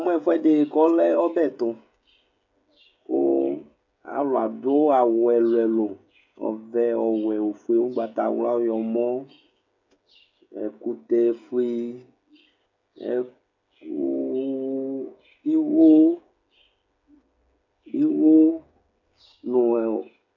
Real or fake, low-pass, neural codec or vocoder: fake; 7.2 kHz; codec, 16 kHz, 16 kbps, FreqCodec, smaller model